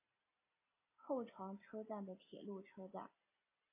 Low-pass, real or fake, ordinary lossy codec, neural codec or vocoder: 3.6 kHz; real; AAC, 24 kbps; none